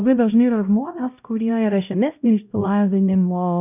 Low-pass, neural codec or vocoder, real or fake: 3.6 kHz; codec, 16 kHz, 0.5 kbps, X-Codec, HuBERT features, trained on LibriSpeech; fake